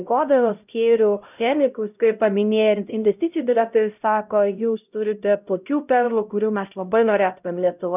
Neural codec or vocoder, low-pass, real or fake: codec, 16 kHz, 0.5 kbps, X-Codec, HuBERT features, trained on LibriSpeech; 3.6 kHz; fake